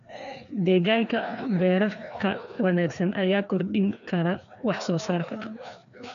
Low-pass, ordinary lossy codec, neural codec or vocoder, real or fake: 7.2 kHz; none; codec, 16 kHz, 2 kbps, FreqCodec, larger model; fake